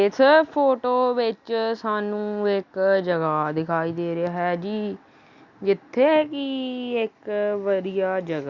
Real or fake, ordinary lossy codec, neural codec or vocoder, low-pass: real; Opus, 64 kbps; none; 7.2 kHz